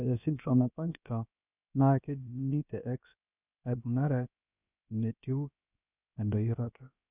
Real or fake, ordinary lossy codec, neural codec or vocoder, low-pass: fake; none; codec, 16 kHz, about 1 kbps, DyCAST, with the encoder's durations; 3.6 kHz